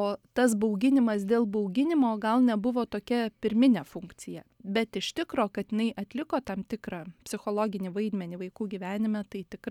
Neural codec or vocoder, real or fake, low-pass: none; real; 19.8 kHz